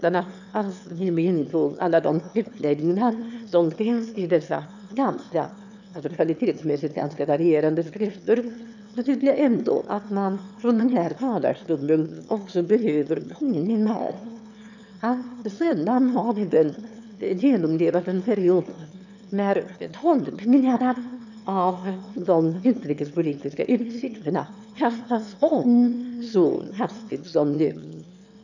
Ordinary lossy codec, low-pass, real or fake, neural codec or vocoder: none; 7.2 kHz; fake; autoencoder, 22.05 kHz, a latent of 192 numbers a frame, VITS, trained on one speaker